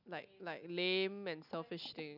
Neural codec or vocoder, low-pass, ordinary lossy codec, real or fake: none; 5.4 kHz; none; real